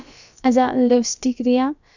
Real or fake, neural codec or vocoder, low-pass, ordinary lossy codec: fake; codec, 16 kHz, about 1 kbps, DyCAST, with the encoder's durations; 7.2 kHz; none